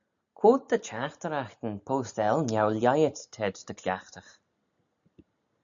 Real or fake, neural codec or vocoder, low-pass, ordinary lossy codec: real; none; 7.2 kHz; MP3, 96 kbps